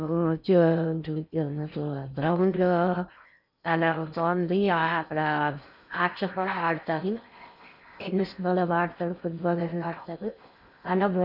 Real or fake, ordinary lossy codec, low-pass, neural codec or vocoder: fake; none; 5.4 kHz; codec, 16 kHz in and 24 kHz out, 0.6 kbps, FocalCodec, streaming, 4096 codes